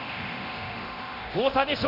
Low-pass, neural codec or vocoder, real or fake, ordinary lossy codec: 5.4 kHz; codec, 24 kHz, 0.9 kbps, DualCodec; fake; none